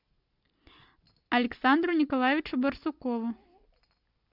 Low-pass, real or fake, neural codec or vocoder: 5.4 kHz; real; none